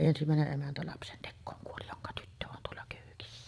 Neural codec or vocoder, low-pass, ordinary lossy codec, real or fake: vocoder, 22.05 kHz, 80 mel bands, Vocos; none; none; fake